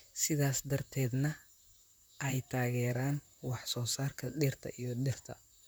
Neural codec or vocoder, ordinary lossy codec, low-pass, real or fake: vocoder, 44.1 kHz, 128 mel bands, Pupu-Vocoder; none; none; fake